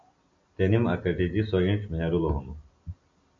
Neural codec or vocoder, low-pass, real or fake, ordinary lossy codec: none; 7.2 kHz; real; AAC, 64 kbps